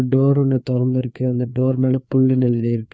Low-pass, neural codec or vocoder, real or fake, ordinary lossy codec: none; codec, 16 kHz, 2 kbps, FreqCodec, larger model; fake; none